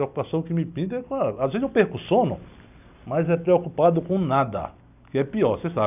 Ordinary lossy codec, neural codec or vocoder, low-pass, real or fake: none; none; 3.6 kHz; real